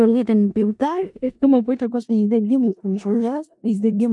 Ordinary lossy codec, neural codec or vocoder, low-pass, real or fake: MP3, 64 kbps; codec, 16 kHz in and 24 kHz out, 0.4 kbps, LongCat-Audio-Codec, four codebook decoder; 10.8 kHz; fake